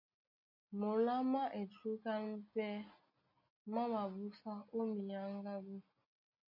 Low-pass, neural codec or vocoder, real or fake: 5.4 kHz; none; real